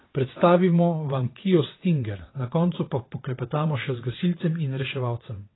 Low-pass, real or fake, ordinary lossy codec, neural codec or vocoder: 7.2 kHz; fake; AAC, 16 kbps; vocoder, 22.05 kHz, 80 mel bands, WaveNeXt